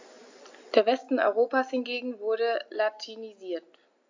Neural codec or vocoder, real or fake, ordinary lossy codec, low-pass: none; real; AAC, 48 kbps; 7.2 kHz